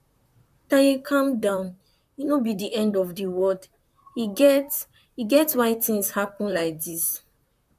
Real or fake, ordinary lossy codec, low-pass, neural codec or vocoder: fake; none; 14.4 kHz; vocoder, 44.1 kHz, 128 mel bands, Pupu-Vocoder